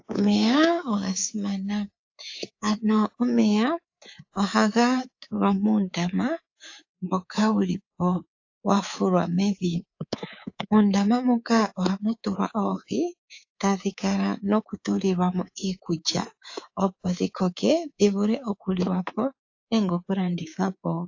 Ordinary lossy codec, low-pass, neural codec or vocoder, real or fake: AAC, 48 kbps; 7.2 kHz; codec, 24 kHz, 3.1 kbps, DualCodec; fake